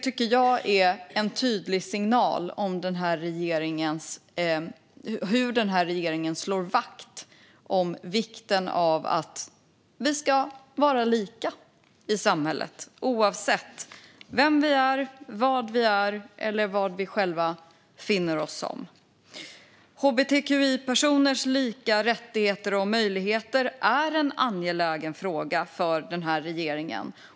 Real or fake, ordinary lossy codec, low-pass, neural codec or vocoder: real; none; none; none